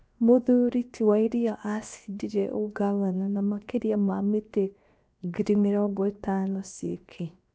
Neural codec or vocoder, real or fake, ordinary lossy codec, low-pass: codec, 16 kHz, 0.7 kbps, FocalCodec; fake; none; none